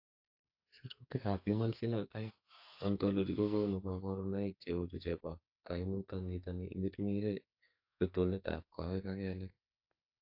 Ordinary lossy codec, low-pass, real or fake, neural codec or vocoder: AAC, 48 kbps; 5.4 kHz; fake; codec, 44.1 kHz, 2.6 kbps, SNAC